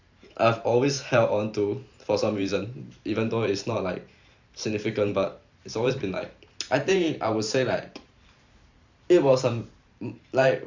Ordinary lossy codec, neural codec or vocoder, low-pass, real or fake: Opus, 64 kbps; vocoder, 44.1 kHz, 128 mel bands every 512 samples, BigVGAN v2; 7.2 kHz; fake